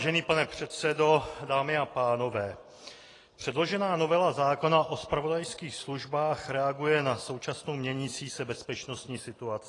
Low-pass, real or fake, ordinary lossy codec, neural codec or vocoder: 10.8 kHz; real; AAC, 32 kbps; none